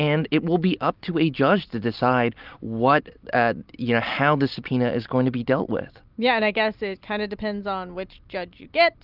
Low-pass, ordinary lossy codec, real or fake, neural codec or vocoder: 5.4 kHz; Opus, 24 kbps; real; none